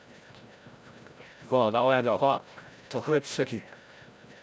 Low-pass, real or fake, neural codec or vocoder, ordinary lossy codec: none; fake; codec, 16 kHz, 0.5 kbps, FreqCodec, larger model; none